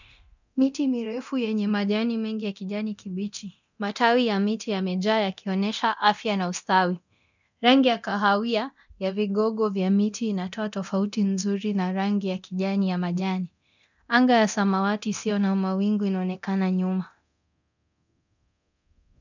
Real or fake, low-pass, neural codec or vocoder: fake; 7.2 kHz; codec, 24 kHz, 0.9 kbps, DualCodec